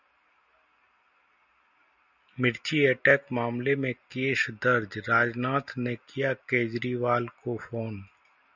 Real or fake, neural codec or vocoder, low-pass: real; none; 7.2 kHz